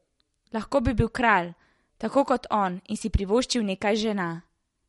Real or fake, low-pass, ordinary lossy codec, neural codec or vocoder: real; 19.8 kHz; MP3, 48 kbps; none